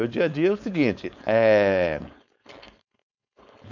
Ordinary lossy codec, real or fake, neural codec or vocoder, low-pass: none; fake; codec, 16 kHz, 4.8 kbps, FACodec; 7.2 kHz